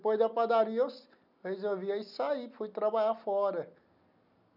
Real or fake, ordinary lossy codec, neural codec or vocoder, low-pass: real; none; none; 5.4 kHz